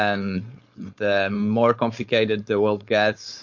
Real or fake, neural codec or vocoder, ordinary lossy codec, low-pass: fake; codec, 16 kHz, 4.8 kbps, FACodec; MP3, 48 kbps; 7.2 kHz